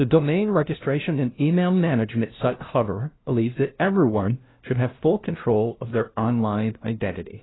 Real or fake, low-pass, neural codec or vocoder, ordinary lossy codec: fake; 7.2 kHz; codec, 16 kHz, 0.5 kbps, FunCodec, trained on LibriTTS, 25 frames a second; AAC, 16 kbps